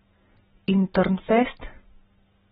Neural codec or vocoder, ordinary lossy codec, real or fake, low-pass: none; AAC, 16 kbps; real; 19.8 kHz